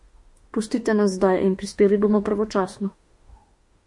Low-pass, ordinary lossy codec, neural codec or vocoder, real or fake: 10.8 kHz; MP3, 48 kbps; autoencoder, 48 kHz, 32 numbers a frame, DAC-VAE, trained on Japanese speech; fake